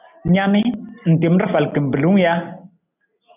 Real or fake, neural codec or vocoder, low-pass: real; none; 3.6 kHz